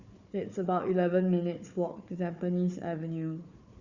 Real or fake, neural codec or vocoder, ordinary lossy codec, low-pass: fake; codec, 16 kHz, 4 kbps, FunCodec, trained on Chinese and English, 50 frames a second; Opus, 64 kbps; 7.2 kHz